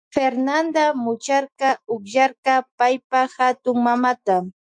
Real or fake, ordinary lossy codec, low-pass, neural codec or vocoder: fake; MP3, 64 kbps; 9.9 kHz; autoencoder, 48 kHz, 128 numbers a frame, DAC-VAE, trained on Japanese speech